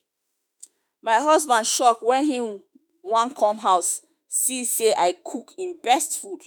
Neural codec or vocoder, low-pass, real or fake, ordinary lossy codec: autoencoder, 48 kHz, 32 numbers a frame, DAC-VAE, trained on Japanese speech; none; fake; none